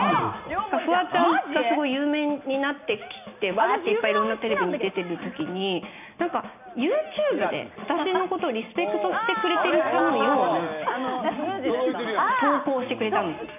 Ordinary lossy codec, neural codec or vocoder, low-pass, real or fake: none; none; 3.6 kHz; real